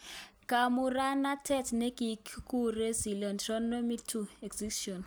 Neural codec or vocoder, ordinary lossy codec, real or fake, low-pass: none; none; real; none